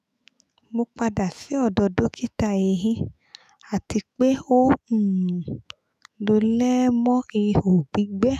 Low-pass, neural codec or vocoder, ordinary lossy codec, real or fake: 14.4 kHz; autoencoder, 48 kHz, 128 numbers a frame, DAC-VAE, trained on Japanese speech; none; fake